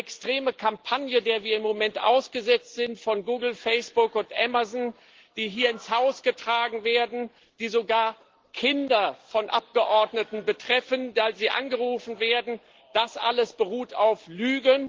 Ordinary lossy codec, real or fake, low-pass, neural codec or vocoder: Opus, 16 kbps; real; 7.2 kHz; none